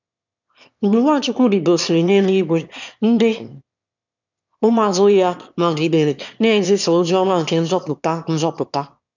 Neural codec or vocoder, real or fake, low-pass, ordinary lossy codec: autoencoder, 22.05 kHz, a latent of 192 numbers a frame, VITS, trained on one speaker; fake; 7.2 kHz; none